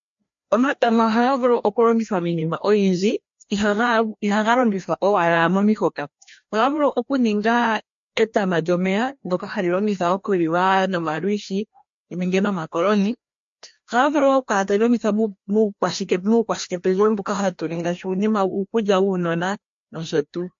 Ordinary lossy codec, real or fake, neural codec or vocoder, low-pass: MP3, 48 kbps; fake; codec, 16 kHz, 1 kbps, FreqCodec, larger model; 7.2 kHz